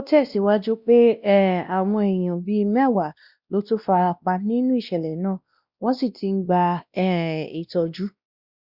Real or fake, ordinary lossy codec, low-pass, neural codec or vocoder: fake; Opus, 64 kbps; 5.4 kHz; codec, 16 kHz, 1 kbps, X-Codec, WavLM features, trained on Multilingual LibriSpeech